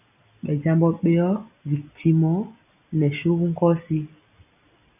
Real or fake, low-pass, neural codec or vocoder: real; 3.6 kHz; none